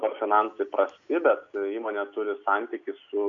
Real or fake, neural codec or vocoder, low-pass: real; none; 7.2 kHz